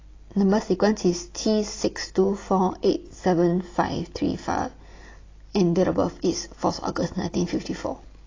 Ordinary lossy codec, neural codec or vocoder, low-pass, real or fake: AAC, 32 kbps; none; 7.2 kHz; real